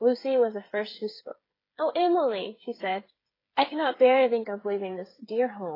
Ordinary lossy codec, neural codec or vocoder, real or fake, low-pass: AAC, 24 kbps; codec, 16 kHz, 8 kbps, FreqCodec, smaller model; fake; 5.4 kHz